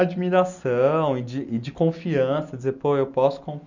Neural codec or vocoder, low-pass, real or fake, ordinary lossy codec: none; 7.2 kHz; real; none